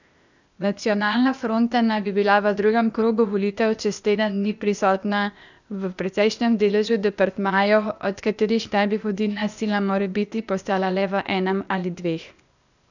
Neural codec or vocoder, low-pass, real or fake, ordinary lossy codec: codec, 16 kHz, 0.8 kbps, ZipCodec; 7.2 kHz; fake; none